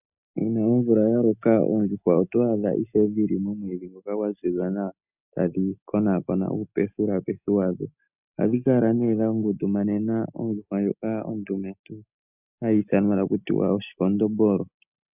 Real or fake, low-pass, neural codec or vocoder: real; 3.6 kHz; none